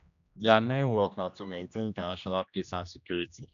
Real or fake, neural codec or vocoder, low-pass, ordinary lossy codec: fake; codec, 16 kHz, 1 kbps, X-Codec, HuBERT features, trained on general audio; 7.2 kHz; none